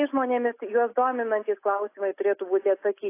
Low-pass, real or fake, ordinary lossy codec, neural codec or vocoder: 3.6 kHz; real; AAC, 24 kbps; none